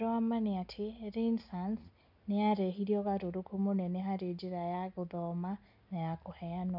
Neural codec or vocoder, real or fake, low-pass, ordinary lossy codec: none; real; 5.4 kHz; none